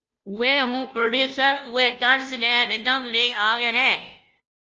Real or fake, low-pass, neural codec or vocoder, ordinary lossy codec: fake; 7.2 kHz; codec, 16 kHz, 0.5 kbps, FunCodec, trained on Chinese and English, 25 frames a second; Opus, 24 kbps